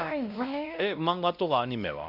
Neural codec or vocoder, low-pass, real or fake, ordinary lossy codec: codec, 16 kHz, 1 kbps, X-Codec, WavLM features, trained on Multilingual LibriSpeech; 5.4 kHz; fake; Opus, 64 kbps